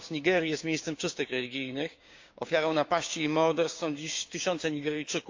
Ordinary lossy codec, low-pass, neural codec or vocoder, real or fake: MP3, 48 kbps; 7.2 kHz; codec, 16 kHz, 6 kbps, DAC; fake